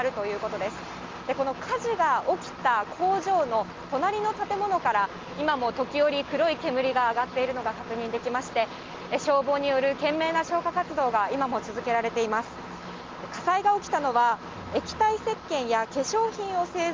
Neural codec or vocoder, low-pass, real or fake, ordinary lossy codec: none; 7.2 kHz; real; Opus, 32 kbps